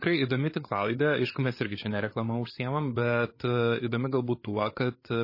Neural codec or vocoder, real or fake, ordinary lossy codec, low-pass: codec, 16 kHz, 8 kbps, FreqCodec, larger model; fake; MP3, 24 kbps; 5.4 kHz